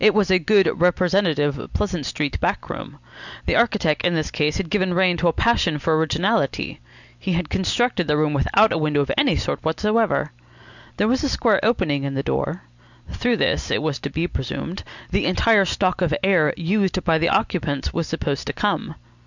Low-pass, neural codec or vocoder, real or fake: 7.2 kHz; none; real